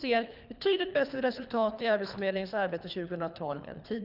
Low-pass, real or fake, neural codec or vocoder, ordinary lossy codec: 5.4 kHz; fake; codec, 24 kHz, 3 kbps, HILCodec; none